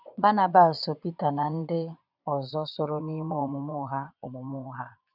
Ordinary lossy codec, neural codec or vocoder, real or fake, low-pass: none; vocoder, 22.05 kHz, 80 mel bands, Vocos; fake; 5.4 kHz